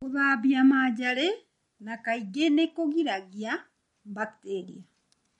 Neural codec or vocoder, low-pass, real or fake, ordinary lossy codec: none; 14.4 kHz; real; MP3, 48 kbps